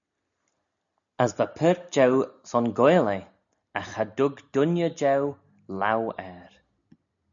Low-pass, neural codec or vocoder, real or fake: 7.2 kHz; none; real